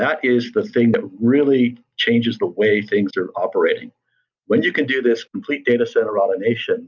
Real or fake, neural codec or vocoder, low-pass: real; none; 7.2 kHz